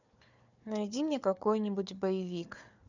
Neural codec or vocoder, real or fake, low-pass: codec, 16 kHz, 4 kbps, FunCodec, trained on Chinese and English, 50 frames a second; fake; 7.2 kHz